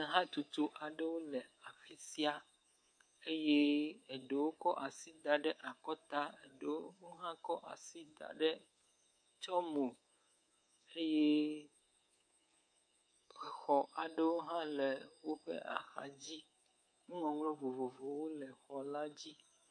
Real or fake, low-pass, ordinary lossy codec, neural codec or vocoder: fake; 9.9 kHz; MP3, 48 kbps; codec, 24 kHz, 3.1 kbps, DualCodec